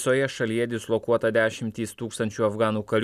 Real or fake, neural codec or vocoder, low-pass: real; none; 14.4 kHz